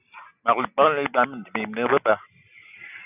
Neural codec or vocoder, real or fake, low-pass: none; real; 3.6 kHz